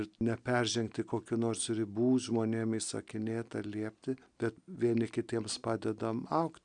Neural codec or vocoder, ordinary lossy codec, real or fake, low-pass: none; Opus, 64 kbps; real; 9.9 kHz